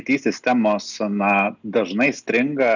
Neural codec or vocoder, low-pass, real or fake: none; 7.2 kHz; real